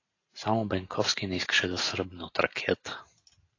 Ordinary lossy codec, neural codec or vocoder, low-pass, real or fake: AAC, 32 kbps; none; 7.2 kHz; real